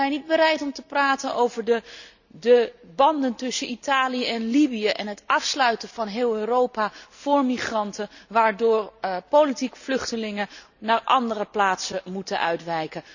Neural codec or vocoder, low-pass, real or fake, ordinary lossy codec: none; 7.2 kHz; real; none